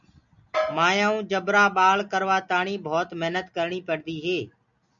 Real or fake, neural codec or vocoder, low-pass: real; none; 7.2 kHz